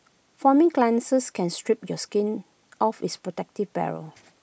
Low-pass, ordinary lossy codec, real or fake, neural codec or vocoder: none; none; real; none